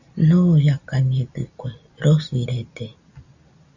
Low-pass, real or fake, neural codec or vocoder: 7.2 kHz; real; none